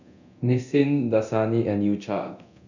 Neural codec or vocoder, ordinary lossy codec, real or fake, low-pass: codec, 24 kHz, 0.9 kbps, DualCodec; none; fake; 7.2 kHz